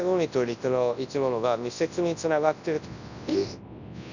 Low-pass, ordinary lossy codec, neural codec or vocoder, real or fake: 7.2 kHz; none; codec, 24 kHz, 0.9 kbps, WavTokenizer, large speech release; fake